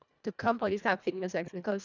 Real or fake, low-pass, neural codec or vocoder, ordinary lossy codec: fake; 7.2 kHz; codec, 24 kHz, 1.5 kbps, HILCodec; none